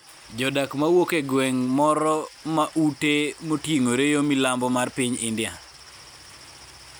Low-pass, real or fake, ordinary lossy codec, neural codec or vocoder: none; real; none; none